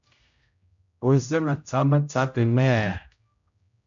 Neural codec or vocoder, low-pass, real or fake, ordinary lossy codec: codec, 16 kHz, 0.5 kbps, X-Codec, HuBERT features, trained on general audio; 7.2 kHz; fake; MP3, 64 kbps